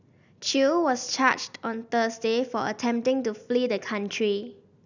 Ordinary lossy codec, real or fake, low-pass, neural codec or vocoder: none; real; 7.2 kHz; none